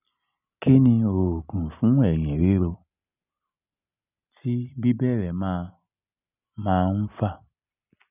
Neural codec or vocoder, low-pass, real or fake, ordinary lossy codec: none; 3.6 kHz; real; none